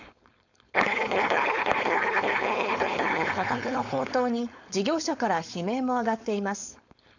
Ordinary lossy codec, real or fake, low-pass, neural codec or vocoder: none; fake; 7.2 kHz; codec, 16 kHz, 4.8 kbps, FACodec